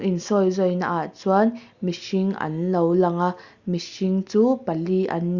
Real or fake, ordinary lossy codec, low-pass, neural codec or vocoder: real; Opus, 64 kbps; 7.2 kHz; none